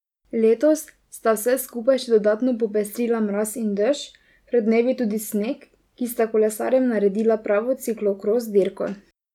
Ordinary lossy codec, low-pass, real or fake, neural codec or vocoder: none; 19.8 kHz; real; none